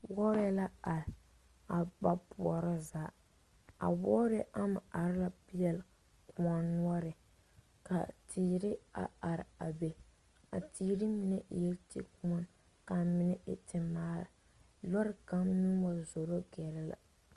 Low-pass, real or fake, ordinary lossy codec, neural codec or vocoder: 10.8 kHz; real; Opus, 32 kbps; none